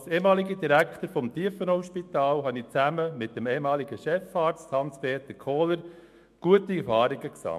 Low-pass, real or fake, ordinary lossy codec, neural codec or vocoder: 14.4 kHz; fake; MP3, 64 kbps; autoencoder, 48 kHz, 128 numbers a frame, DAC-VAE, trained on Japanese speech